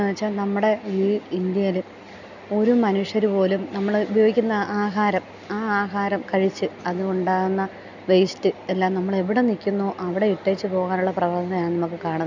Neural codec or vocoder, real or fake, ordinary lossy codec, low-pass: none; real; none; 7.2 kHz